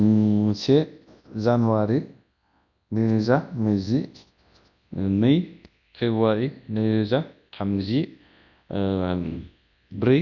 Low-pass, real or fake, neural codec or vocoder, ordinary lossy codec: 7.2 kHz; fake; codec, 24 kHz, 0.9 kbps, WavTokenizer, large speech release; none